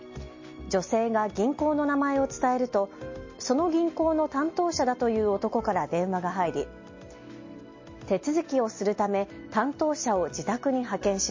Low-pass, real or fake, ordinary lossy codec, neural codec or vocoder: 7.2 kHz; real; MP3, 32 kbps; none